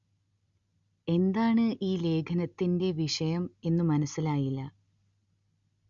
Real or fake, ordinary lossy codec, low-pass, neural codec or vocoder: real; Opus, 64 kbps; 7.2 kHz; none